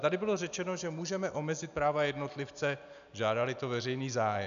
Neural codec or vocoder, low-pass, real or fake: none; 7.2 kHz; real